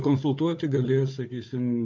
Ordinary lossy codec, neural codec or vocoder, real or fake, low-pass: MP3, 64 kbps; codec, 16 kHz, 4 kbps, FunCodec, trained on Chinese and English, 50 frames a second; fake; 7.2 kHz